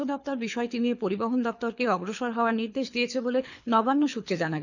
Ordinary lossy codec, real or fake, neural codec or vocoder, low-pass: none; fake; codec, 24 kHz, 6 kbps, HILCodec; 7.2 kHz